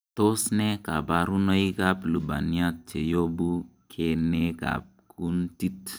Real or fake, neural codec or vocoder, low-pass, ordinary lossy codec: real; none; none; none